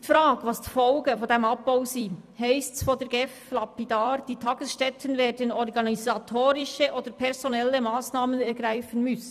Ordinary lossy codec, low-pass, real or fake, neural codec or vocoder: none; 14.4 kHz; fake; vocoder, 44.1 kHz, 128 mel bands every 256 samples, BigVGAN v2